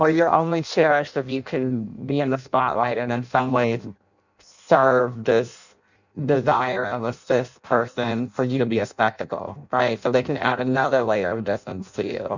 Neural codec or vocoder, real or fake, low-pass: codec, 16 kHz in and 24 kHz out, 0.6 kbps, FireRedTTS-2 codec; fake; 7.2 kHz